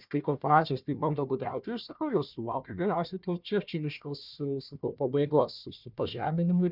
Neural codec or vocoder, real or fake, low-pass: codec, 16 kHz, 1 kbps, FunCodec, trained on Chinese and English, 50 frames a second; fake; 5.4 kHz